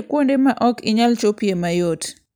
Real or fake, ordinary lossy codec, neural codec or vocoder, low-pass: real; none; none; none